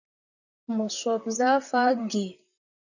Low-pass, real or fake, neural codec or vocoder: 7.2 kHz; fake; vocoder, 44.1 kHz, 128 mel bands, Pupu-Vocoder